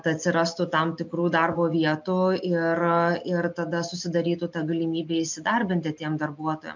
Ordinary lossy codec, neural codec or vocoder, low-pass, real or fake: MP3, 64 kbps; none; 7.2 kHz; real